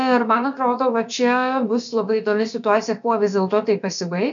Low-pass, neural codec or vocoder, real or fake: 7.2 kHz; codec, 16 kHz, about 1 kbps, DyCAST, with the encoder's durations; fake